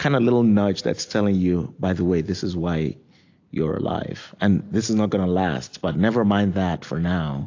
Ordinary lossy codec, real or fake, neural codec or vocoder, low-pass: AAC, 48 kbps; real; none; 7.2 kHz